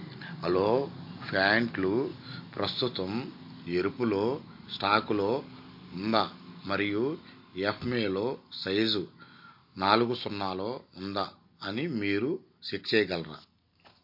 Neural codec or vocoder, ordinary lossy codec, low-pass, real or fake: none; MP3, 32 kbps; 5.4 kHz; real